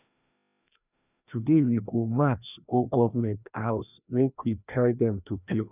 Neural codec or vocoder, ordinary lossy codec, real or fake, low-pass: codec, 16 kHz, 1 kbps, FreqCodec, larger model; none; fake; 3.6 kHz